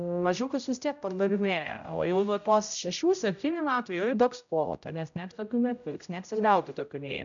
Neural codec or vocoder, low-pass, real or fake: codec, 16 kHz, 0.5 kbps, X-Codec, HuBERT features, trained on general audio; 7.2 kHz; fake